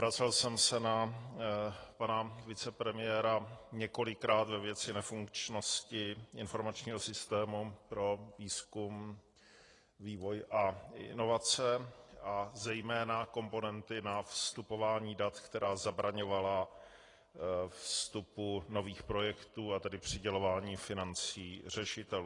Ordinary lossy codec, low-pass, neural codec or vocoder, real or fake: AAC, 32 kbps; 10.8 kHz; none; real